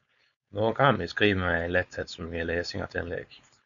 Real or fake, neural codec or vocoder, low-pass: fake; codec, 16 kHz, 4.8 kbps, FACodec; 7.2 kHz